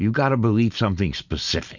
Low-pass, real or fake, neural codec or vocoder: 7.2 kHz; real; none